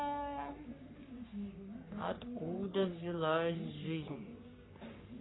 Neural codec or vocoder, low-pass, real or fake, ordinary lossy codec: codec, 44.1 kHz, 3.4 kbps, Pupu-Codec; 7.2 kHz; fake; AAC, 16 kbps